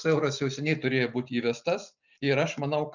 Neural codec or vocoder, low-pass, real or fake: none; 7.2 kHz; real